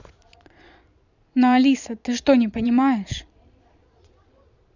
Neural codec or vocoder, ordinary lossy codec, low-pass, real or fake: vocoder, 22.05 kHz, 80 mel bands, Vocos; none; 7.2 kHz; fake